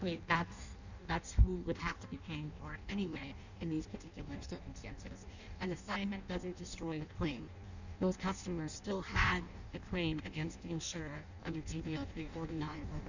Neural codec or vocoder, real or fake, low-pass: codec, 16 kHz in and 24 kHz out, 0.6 kbps, FireRedTTS-2 codec; fake; 7.2 kHz